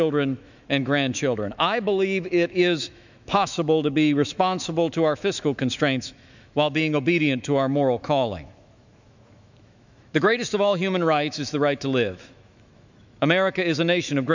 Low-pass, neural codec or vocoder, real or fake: 7.2 kHz; autoencoder, 48 kHz, 128 numbers a frame, DAC-VAE, trained on Japanese speech; fake